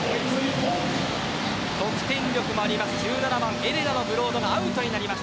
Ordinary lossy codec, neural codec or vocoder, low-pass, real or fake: none; none; none; real